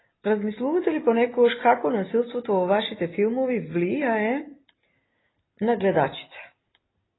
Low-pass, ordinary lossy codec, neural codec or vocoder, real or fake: 7.2 kHz; AAC, 16 kbps; none; real